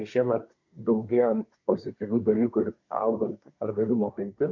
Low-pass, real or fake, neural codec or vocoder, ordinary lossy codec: 7.2 kHz; fake; codec, 24 kHz, 1 kbps, SNAC; MP3, 48 kbps